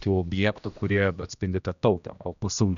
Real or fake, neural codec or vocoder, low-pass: fake; codec, 16 kHz, 1 kbps, X-Codec, HuBERT features, trained on general audio; 7.2 kHz